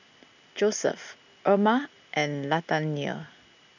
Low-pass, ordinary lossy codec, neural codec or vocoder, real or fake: 7.2 kHz; none; none; real